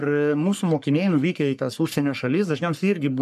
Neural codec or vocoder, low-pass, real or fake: codec, 44.1 kHz, 3.4 kbps, Pupu-Codec; 14.4 kHz; fake